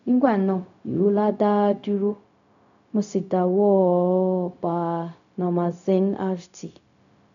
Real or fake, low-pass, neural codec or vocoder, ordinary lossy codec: fake; 7.2 kHz; codec, 16 kHz, 0.4 kbps, LongCat-Audio-Codec; none